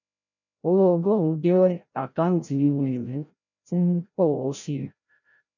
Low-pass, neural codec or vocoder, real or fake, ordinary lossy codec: 7.2 kHz; codec, 16 kHz, 0.5 kbps, FreqCodec, larger model; fake; none